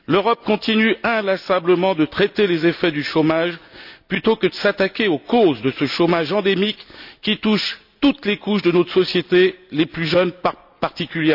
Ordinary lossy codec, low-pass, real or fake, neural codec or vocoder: none; 5.4 kHz; real; none